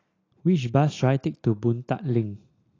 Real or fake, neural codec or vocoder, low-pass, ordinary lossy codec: real; none; 7.2 kHz; AAC, 32 kbps